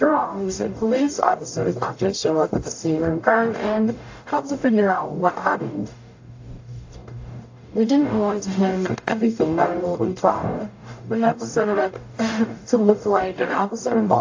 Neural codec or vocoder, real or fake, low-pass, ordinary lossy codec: codec, 44.1 kHz, 0.9 kbps, DAC; fake; 7.2 kHz; AAC, 48 kbps